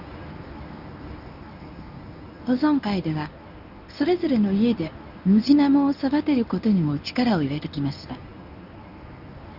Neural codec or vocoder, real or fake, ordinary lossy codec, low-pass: codec, 24 kHz, 0.9 kbps, WavTokenizer, medium speech release version 1; fake; none; 5.4 kHz